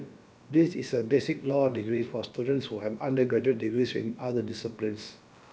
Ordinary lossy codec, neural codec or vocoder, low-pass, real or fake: none; codec, 16 kHz, about 1 kbps, DyCAST, with the encoder's durations; none; fake